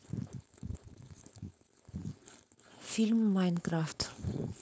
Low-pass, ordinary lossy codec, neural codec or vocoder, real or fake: none; none; codec, 16 kHz, 4.8 kbps, FACodec; fake